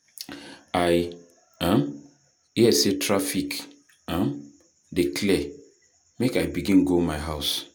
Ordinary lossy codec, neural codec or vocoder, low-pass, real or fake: none; none; none; real